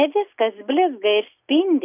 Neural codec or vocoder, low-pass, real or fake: none; 3.6 kHz; real